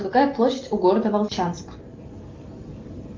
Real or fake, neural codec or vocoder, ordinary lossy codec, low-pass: real; none; Opus, 32 kbps; 7.2 kHz